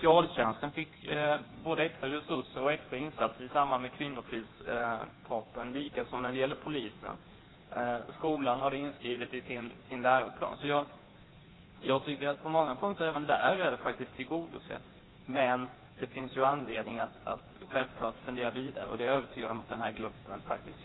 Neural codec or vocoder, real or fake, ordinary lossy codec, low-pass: codec, 16 kHz in and 24 kHz out, 1.1 kbps, FireRedTTS-2 codec; fake; AAC, 16 kbps; 7.2 kHz